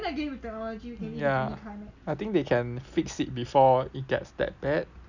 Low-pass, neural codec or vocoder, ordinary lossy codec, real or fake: 7.2 kHz; none; none; real